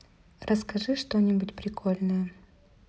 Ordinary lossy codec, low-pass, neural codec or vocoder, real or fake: none; none; none; real